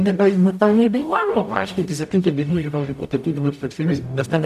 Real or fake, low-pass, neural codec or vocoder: fake; 14.4 kHz; codec, 44.1 kHz, 0.9 kbps, DAC